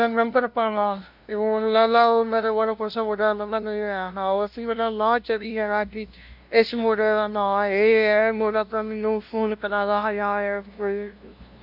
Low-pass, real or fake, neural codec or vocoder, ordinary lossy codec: 5.4 kHz; fake; codec, 16 kHz, 0.5 kbps, FunCodec, trained on LibriTTS, 25 frames a second; MP3, 48 kbps